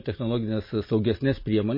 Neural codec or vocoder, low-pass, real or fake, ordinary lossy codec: none; 5.4 kHz; real; MP3, 24 kbps